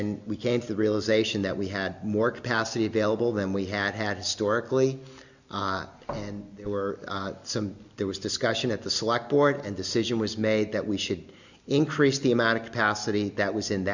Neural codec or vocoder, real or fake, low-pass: none; real; 7.2 kHz